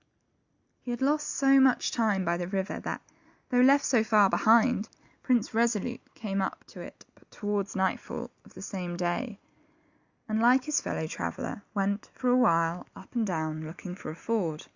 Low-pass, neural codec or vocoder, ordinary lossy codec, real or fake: 7.2 kHz; none; Opus, 64 kbps; real